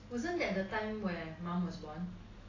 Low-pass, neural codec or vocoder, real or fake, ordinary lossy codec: 7.2 kHz; none; real; AAC, 32 kbps